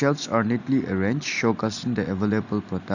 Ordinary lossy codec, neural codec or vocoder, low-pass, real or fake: none; none; 7.2 kHz; real